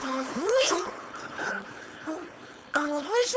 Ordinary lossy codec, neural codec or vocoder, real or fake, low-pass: none; codec, 16 kHz, 4.8 kbps, FACodec; fake; none